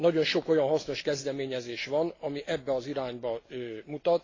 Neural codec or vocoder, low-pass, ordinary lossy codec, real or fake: none; 7.2 kHz; AAC, 32 kbps; real